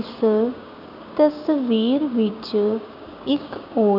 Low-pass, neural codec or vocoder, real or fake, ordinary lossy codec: 5.4 kHz; codec, 16 kHz, 6 kbps, DAC; fake; none